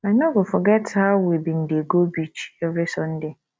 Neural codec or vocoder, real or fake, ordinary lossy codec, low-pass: none; real; none; none